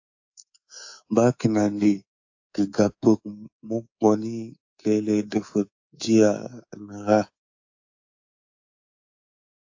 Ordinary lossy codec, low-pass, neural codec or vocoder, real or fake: AAC, 48 kbps; 7.2 kHz; codec, 24 kHz, 3.1 kbps, DualCodec; fake